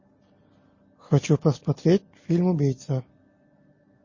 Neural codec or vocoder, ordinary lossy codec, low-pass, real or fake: none; MP3, 32 kbps; 7.2 kHz; real